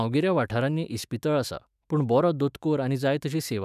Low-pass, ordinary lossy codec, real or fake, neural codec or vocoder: 14.4 kHz; none; fake; autoencoder, 48 kHz, 128 numbers a frame, DAC-VAE, trained on Japanese speech